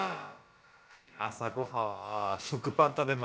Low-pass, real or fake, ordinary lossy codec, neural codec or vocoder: none; fake; none; codec, 16 kHz, about 1 kbps, DyCAST, with the encoder's durations